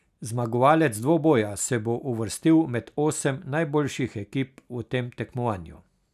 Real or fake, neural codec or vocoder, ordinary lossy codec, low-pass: real; none; none; 14.4 kHz